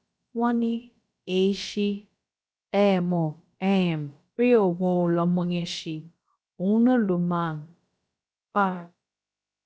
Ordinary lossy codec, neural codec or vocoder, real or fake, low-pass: none; codec, 16 kHz, about 1 kbps, DyCAST, with the encoder's durations; fake; none